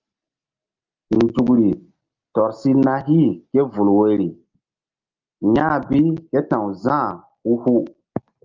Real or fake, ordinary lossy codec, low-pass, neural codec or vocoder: real; Opus, 24 kbps; 7.2 kHz; none